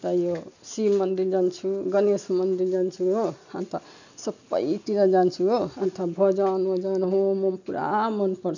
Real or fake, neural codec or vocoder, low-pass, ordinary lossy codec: real; none; 7.2 kHz; none